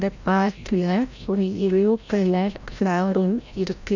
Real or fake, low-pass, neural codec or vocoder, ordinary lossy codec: fake; 7.2 kHz; codec, 16 kHz, 0.5 kbps, FreqCodec, larger model; none